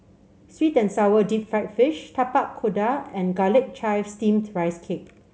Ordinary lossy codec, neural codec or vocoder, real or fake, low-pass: none; none; real; none